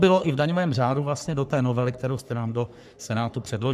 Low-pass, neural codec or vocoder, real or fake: 14.4 kHz; codec, 44.1 kHz, 3.4 kbps, Pupu-Codec; fake